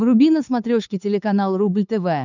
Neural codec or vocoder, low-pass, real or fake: codec, 16 kHz, 8 kbps, FunCodec, trained on LibriTTS, 25 frames a second; 7.2 kHz; fake